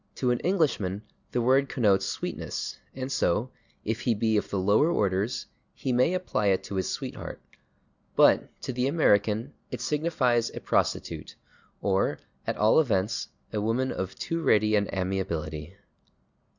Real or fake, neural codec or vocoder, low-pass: real; none; 7.2 kHz